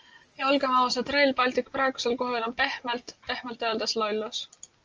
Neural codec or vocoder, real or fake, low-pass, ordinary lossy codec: none; real; 7.2 kHz; Opus, 24 kbps